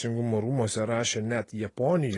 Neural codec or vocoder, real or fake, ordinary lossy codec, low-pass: none; real; AAC, 32 kbps; 10.8 kHz